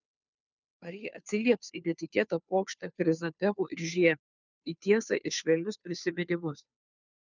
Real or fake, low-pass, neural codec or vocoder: fake; 7.2 kHz; codec, 16 kHz, 2 kbps, FunCodec, trained on Chinese and English, 25 frames a second